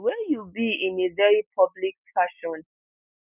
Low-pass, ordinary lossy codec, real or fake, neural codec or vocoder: 3.6 kHz; none; real; none